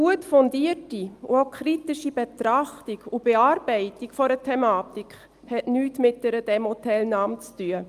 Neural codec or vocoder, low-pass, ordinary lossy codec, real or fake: none; 14.4 kHz; Opus, 32 kbps; real